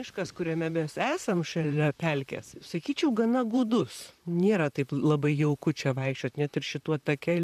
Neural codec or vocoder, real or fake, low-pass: vocoder, 44.1 kHz, 128 mel bands, Pupu-Vocoder; fake; 14.4 kHz